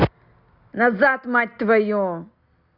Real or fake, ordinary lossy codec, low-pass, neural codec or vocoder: real; Opus, 64 kbps; 5.4 kHz; none